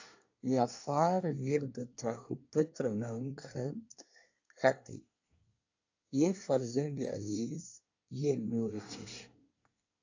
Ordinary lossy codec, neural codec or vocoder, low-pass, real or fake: AAC, 48 kbps; codec, 24 kHz, 1 kbps, SNAC; 7.2 kHz; fake